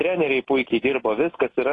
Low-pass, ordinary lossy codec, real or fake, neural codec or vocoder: 10.8 kHz; AAC, 32 kbps; real; none